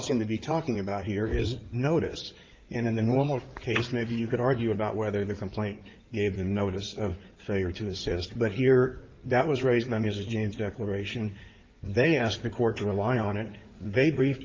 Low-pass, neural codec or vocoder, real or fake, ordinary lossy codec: 7.2 kHz; codec, 16 kHz in and 24 kHz out, 2.2 kbps, FireRedTTS-2 codec; fake; Opus, 32 kbps